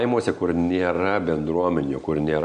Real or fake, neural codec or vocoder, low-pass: real; none; 9.9 kHz